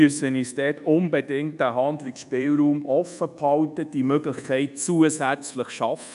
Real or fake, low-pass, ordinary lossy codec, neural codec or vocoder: fake; 10.8 kHz; none; codec, 24 kHz, 1.2 kbps, DualCodec